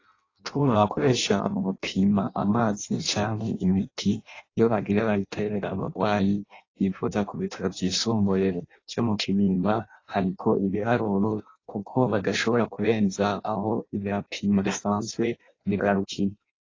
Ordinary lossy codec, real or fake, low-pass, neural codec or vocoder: AAC, 32 kbps; fake; 7.2 kHz; codec, 16 kHz in and 24 kHz out, 0.6 kbps, FireRedTTS-2 codec